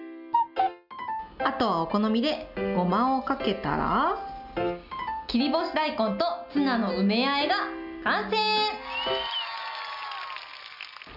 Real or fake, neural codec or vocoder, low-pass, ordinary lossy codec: real; none; 5.4 kHz; none